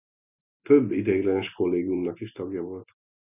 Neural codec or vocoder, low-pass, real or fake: none; 3.6 kHz; real